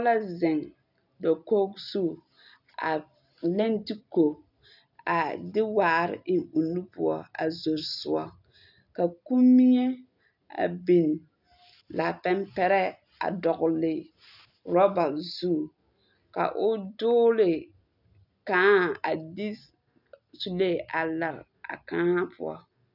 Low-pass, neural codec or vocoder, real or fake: 5.4 kHz; none; real